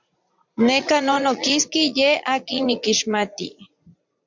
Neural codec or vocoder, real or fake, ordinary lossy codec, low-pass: vocoder, 44.1 kHz, 80 mel bands, Vocos; fake; MP3, 64 kbps; 7.2 kHz